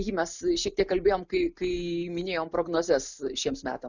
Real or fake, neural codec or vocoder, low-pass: real; none; 7.2 kHz